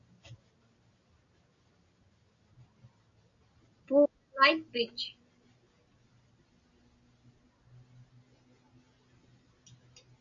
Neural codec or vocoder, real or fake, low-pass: none; real; 7.2 kHz